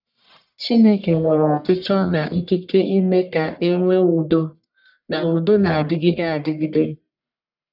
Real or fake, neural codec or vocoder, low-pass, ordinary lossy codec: fake; codec, 44.1 kHz, 1.7 kbps, Pupu-Codec; 5.4 kHz; none